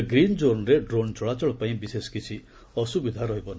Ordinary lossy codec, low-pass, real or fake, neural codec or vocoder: none; none; real; none